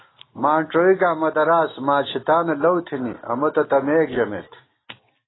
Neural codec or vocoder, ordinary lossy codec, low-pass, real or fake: none; AAC, 16 kbps; 7.2 kHz; real